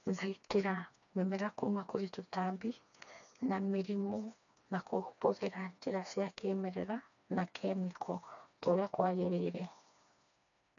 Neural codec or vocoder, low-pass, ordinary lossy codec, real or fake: codec, 16 kHz, 2 kbps, FreqCodec, smaller model; 7.2 kHz; none; fake